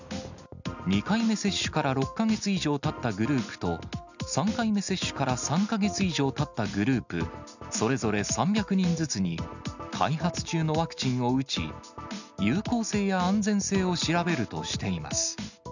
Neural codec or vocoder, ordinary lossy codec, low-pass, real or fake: none; none; 7.2 kHz; real